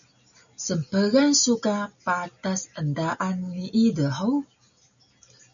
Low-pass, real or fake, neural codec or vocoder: 7.2 kHz; real; none